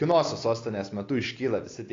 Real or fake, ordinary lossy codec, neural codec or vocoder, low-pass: real; AAC, 32 kbps; none; 7.2 kHz